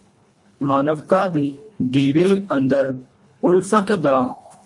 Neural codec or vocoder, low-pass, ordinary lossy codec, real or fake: codec, 24 kHz, 1.5 kbps, HILCodec; 10.8 kHz; MP3, 48 kbps; fake